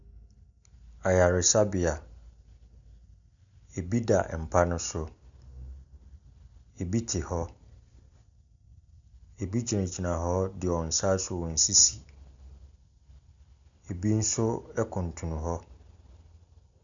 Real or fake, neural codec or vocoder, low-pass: real; none; 7.2 kHz